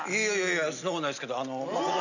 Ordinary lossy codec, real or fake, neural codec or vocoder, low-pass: none; real; none; 7.2 kHz